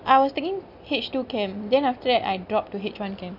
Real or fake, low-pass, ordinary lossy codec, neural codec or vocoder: real; 5.4 kHz; none; none